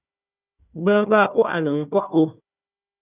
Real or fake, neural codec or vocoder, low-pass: fake; codec, 16 kHz, 1 kbps, FunCodec, trained on Chinese and English, 50 frames a second; 3.6 kHz